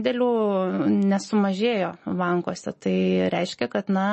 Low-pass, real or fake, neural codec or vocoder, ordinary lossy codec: 10.8 kHz; real; none; MP3, 32 kbps